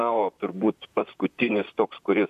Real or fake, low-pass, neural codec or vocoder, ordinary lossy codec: fake; 14.4 kHz; vocoder, 44.1 kHz, 128 mel bands, Pupu-Vocoder; AAC, 96 kbps